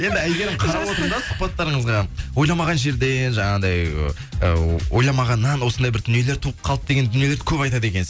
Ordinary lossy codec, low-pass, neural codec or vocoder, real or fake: none; none; none; real